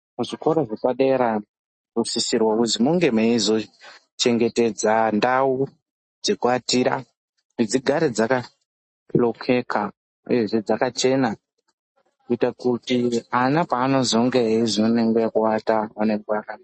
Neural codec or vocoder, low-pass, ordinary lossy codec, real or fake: none; 10.8 kHz; MP3, 32 kbps; real